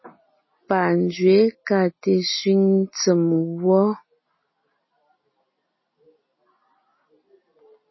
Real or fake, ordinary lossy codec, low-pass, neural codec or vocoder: real; MP3, 24 kbps; 7.2 kHz; none